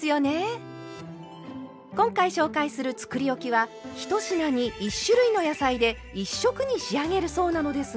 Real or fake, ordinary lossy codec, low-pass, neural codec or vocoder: real; none; none; none